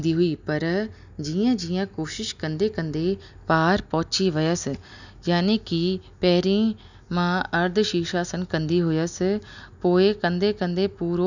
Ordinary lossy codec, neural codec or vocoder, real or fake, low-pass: none; none; real; 7.2 kHz